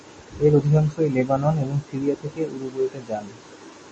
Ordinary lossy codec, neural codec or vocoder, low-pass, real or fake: MP3, 32 kbps; none; 9.9 kHz; real